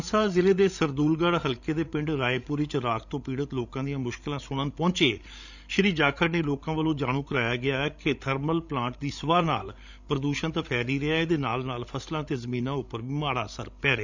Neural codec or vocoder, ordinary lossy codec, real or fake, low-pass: codec, 16 kHz, 16 kbps, FreqCodec, larger model; none; fake; 7.2 kHz